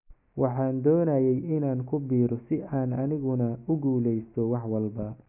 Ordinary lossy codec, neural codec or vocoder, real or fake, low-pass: none; none; real; 3.6 kHz